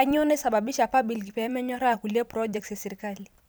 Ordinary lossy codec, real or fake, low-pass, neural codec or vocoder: none; fake; none; vocoder, 44.1 kHz, 128 mel bands every 256 samples, BigVGAN v2